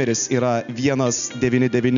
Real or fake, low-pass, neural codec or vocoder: real; 7.2 kHz; none